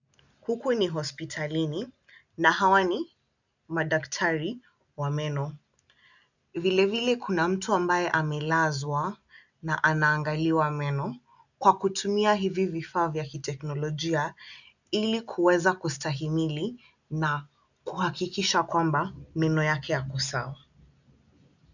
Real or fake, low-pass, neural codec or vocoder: real; 7.2 kHz; none